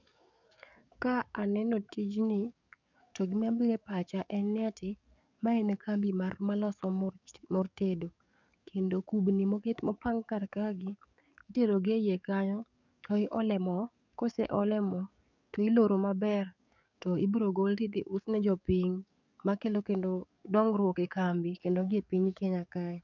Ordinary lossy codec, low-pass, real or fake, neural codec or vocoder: none; 7.2 kHz; fake; codec, 44.1 kHz, 7.8 kbps, DAC